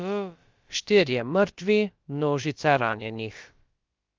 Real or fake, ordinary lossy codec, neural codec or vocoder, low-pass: fake; Opus, 32 kbps; codec, 16 kHz, about 1 kbps, DyCAST, with the encoder's durations; 7.2 kHz